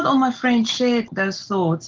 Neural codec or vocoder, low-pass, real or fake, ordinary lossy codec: none; 7.2 kHz; real; Opus, 16 kbps